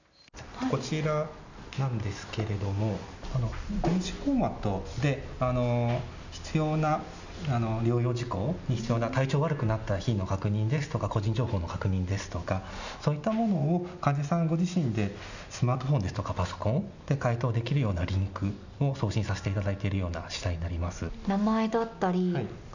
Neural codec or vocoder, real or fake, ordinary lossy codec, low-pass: none; real; none; 7.2 kHz